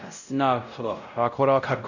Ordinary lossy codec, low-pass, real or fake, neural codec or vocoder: none; 7.2 kHz; fake; codec, 16 kHz, 0.5 kbps, X-Codec, WavLM features, trained on Multilingual LibriSpeech